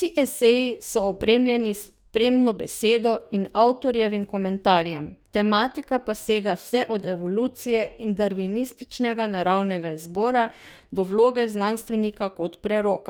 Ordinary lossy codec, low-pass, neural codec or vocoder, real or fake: none; none; codec, 44.1 kHz, 2.6 kbps, DAC; fake